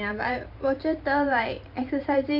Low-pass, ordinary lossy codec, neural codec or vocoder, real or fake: 5.4 kHz; none; vocoder, 44.1 kHz, 128 mel bands every 512 samples, BigVGAN v2; fake